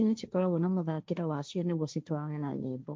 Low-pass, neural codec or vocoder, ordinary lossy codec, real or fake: none; codec, 16 kHz, 1.1 kbps, Voila-Tokenizer; none; fake